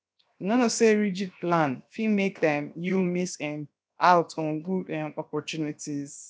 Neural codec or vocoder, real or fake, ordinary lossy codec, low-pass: codec, 16 kHz, 0.7 kbps, FocalCodec; fake; none; none